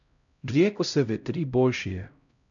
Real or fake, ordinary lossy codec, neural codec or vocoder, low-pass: fake; MP3, 96 kbps; codec, 16 kHz, 0.5 kbps, X-Codec, HuBERT features, trained on LibriSpeech; 7.2 kHz